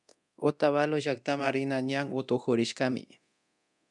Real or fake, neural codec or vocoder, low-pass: fake; codec, 24 kHz, 0.9 kbps, DualCodec; 10.8 kHz